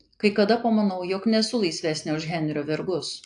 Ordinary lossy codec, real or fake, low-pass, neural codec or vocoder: AAC, 64 kbps; real; 9.9 kHz; none